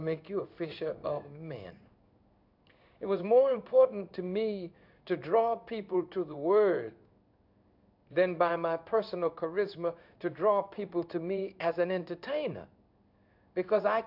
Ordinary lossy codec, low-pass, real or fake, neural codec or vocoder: AAC, 48 kbps; 5.4 kHz; fake; codec, 16 kHz in and 24 kHz out, 1 kbps, XY-Tokenizer